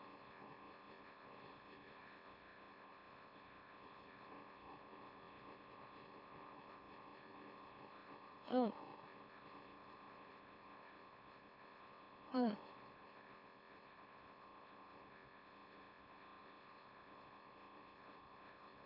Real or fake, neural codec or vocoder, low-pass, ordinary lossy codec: fake; autoencoder, 44.1 kHz, a latent of 192 numbers a frame, MeloTTS; 5.4 kHz; MP3, 48 kbps